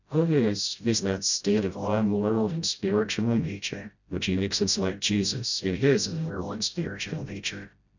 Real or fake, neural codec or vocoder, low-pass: fake; codec, 16 kHz, 0.5 kbps, FreqCodec, smaller model; 7.2 kHz